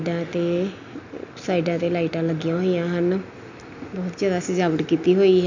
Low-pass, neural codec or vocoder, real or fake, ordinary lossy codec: 7.2 kHz; none; real; MP3, 64 kbps